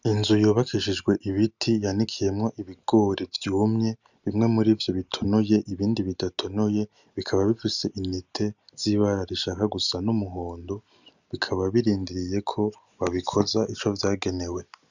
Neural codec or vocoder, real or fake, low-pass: none; real; 7.2 kHz